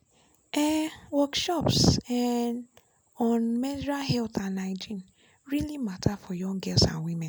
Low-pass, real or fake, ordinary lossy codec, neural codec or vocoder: none; real; none; none